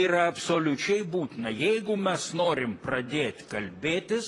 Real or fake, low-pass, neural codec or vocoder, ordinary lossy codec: fake; 10.8 kHz; vocoder, 44.1 kHz, 128 mel bands, Pupu-Vocoder; AAC, 32 kbps